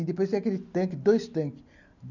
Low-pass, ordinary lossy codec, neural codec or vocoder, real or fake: 7.2 kHz; none; none; real